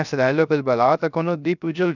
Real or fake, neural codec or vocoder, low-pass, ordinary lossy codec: fake; codec, 16 kHz, 0.3 kbps, FocalCodec; 7.2 kHz; none